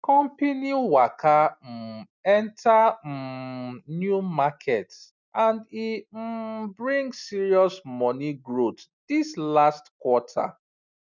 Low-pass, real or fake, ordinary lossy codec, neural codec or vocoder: 7.2 kHz; real; none; none